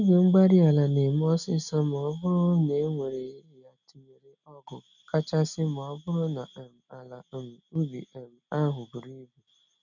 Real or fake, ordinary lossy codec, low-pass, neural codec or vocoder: real; none; 7.2 kHz; none